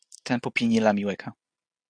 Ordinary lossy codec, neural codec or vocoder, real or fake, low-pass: AAC, 64 kbps; none; real; 9.9 kHz